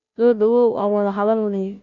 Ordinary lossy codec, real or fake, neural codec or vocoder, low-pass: none; fake; codec, 16 kHz, 0.5 kbps, FunCodec, trained on Chinese and English, 25 frames a second; 7.2 kHz